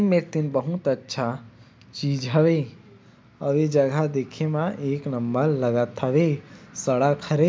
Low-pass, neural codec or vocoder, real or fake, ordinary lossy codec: none; none; real; none